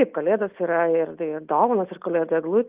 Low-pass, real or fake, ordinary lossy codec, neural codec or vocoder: 3.6 kHz; real; Opus, 32 kbps; none